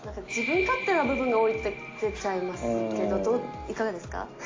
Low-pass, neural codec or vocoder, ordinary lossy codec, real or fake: 7.2 kHz; none; AAC, 32 kbps; real